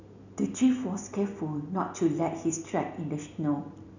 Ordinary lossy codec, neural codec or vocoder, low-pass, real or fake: none; none; 7.2 kHz; real